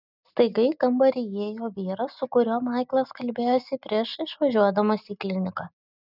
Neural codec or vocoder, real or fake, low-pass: none; real; 5.4 kHz